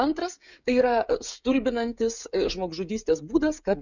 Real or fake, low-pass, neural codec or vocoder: fake; 7.2 kHz; codec, 16 kHz, 16 kbps, FreqCodec, smaller model